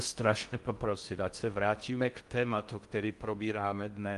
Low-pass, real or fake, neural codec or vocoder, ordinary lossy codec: 10.8 kHz; fake; codec, 16 kHz in and 24 kHz out, 0.6 kbps, FocalCodec, streaming, 4096 codes; Opus, 24 kbps